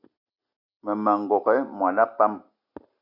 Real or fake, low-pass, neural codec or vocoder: real; 5.4 kHz; none